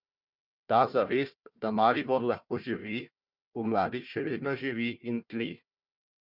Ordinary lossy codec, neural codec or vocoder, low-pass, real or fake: Opus, 64 kbps; codec, 16 kHz, 1 kbps, FunCodec, trained on Chinese and English, 50 frames a second; 5.4 kHz; fake